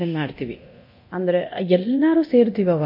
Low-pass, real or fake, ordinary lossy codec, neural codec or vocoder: 5.4 kHz; fake; MP3, 32 kbps; codec, 24 kHz, 0.9 kbps, DualCodec